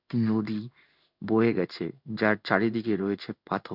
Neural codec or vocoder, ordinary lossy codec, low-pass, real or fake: codec, 16 kHz in and 24 kHz out, 1 kbps, XY-Tokenizer; none; 5.4 kHz; fake